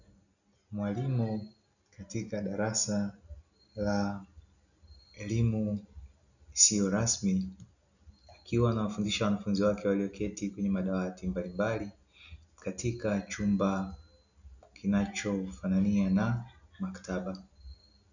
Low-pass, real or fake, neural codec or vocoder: 7.2 kHz; real; none